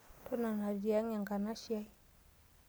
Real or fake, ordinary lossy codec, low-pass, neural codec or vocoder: real; none; none; none